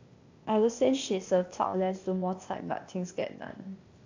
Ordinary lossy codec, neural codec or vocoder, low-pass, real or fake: AAC, 48 kbps; codec, 16 kHz, 0.8 kbps, ZipCodec; 7.2 kHz; fake